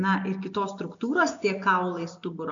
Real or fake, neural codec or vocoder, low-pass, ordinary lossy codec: real; none; 7.2 kHz; AAC, 64 kbps